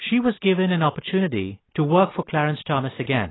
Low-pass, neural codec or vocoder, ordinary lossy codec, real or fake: 7.2 kHz; none; AAC, 16 kbps; real